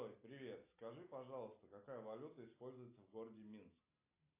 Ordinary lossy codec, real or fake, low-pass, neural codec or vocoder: AAC, 24 kbps; real; 3.6 kHz; none